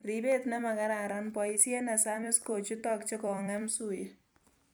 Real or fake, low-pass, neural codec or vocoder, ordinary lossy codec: fake; none; vocoder, 44.1 kHz, 128 mel bands every 256 samples, BigVGAN v2; none